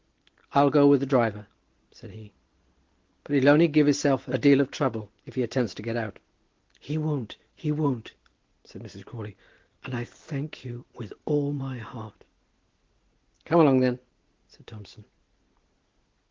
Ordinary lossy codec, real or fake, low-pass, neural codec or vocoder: Opus, 16 kbps; real; 7.2 kHz; none